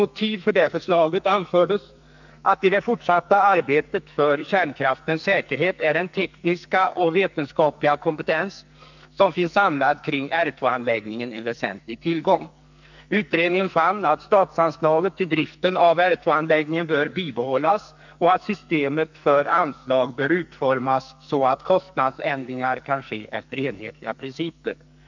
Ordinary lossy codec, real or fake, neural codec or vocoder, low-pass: none; fake; codec, 44.1 kHz, 2.6 kbps, SNAC; 7.2 kHz